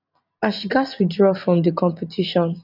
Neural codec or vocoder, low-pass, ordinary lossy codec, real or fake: none; 5.4 kHz; none; real